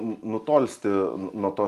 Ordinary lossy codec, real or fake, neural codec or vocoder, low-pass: Opus, 32 kbps; real; none; 14.4 kHz